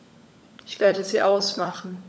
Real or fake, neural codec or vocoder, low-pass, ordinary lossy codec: fake; codec, 16 kHz, 16 kbps, FunCodec, trained on LibriTTS, 50 frames a second; none; none